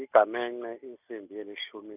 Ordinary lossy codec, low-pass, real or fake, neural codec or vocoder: none; 3.6 kHz; real; none